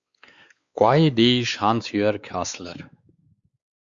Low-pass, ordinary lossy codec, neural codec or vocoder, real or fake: 7.2 kHz; Opus, 64 kbps; codec, 16 kHz, 4 kbps, X-Codec, WavLM features, trained on Multilingual LibriSpeech; fake